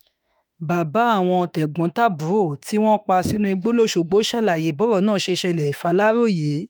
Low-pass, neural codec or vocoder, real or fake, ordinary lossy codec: none; autoencoder, 48 kHz, 32 numbers a frame, DAC-VAE, trained on Japanese speech; fake; none